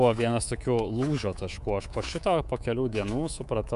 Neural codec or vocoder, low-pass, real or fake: codec, 24 kHz, 3.1 kbps, DualCodec; 10.8 kHz; fake